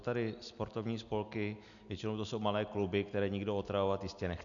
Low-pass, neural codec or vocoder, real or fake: 7.2 kHz; none; real